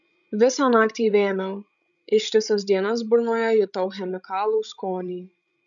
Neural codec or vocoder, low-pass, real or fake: codec, 16 kHz, 16 kbps, FreqCodec, larger model; 7.2 kHz; fake